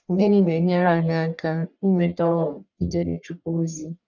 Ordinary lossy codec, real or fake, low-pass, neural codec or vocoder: none; fake; 7.2 kHz; codec, 44.1 kHz, 1.7 kbps, Pupu-Codec